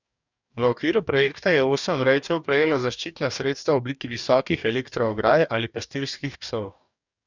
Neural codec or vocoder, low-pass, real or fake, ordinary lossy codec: codec, 44.1 kHz, 2.6 kbps, DAC; 7.2 kHz; fake; none